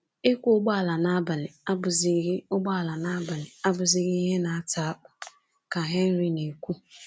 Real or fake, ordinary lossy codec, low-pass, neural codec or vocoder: real; none; none; none